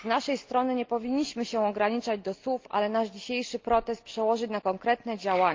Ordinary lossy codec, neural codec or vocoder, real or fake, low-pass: Opus, 32 kbps; none; real; 7.2 kHz